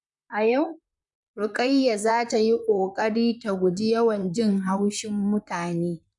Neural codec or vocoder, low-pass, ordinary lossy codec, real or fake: vocoder, 44.1 kHz, 128 mel bands, Pupu-Vocoder; 10.8 kHz; none; fake